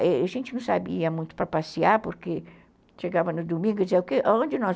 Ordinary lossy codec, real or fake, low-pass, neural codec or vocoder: none; real; none; none